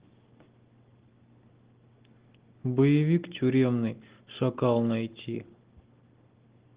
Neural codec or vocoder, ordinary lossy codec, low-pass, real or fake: none; Opus, 16 kbps; 3.6 kHz; real